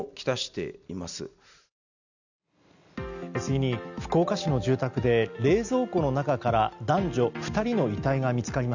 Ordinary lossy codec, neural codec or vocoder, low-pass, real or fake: none; none; 7.2 kHz; real